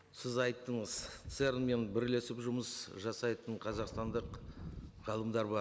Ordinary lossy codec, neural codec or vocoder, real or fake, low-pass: none; none; real; none